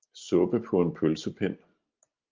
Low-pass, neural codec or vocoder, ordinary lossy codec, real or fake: 7.2 kHz; none; Opus, 24 kbps; real